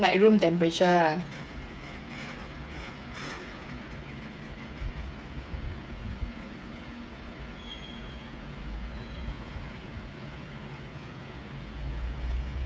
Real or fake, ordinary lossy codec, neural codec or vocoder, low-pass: fake; none; codec, 16 kHz, 8 kbps, FreqCodec, smaller model; none